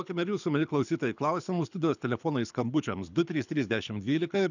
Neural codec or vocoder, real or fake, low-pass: codec, 24 kHz, 6 kbps, HILCodec; fake; 7.2 kHz